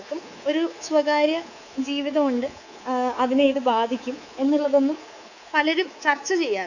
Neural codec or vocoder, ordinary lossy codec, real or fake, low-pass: codec, 24 kHz, 3.1 kbps, DualCodec; none; fake; 7.2 kHz